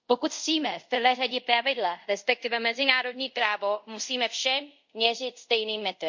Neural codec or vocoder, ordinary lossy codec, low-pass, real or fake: codec, 24 kHz, 0.5 kbps, DualCodec; MP3, 48 kbps; 7.2 kHz; fake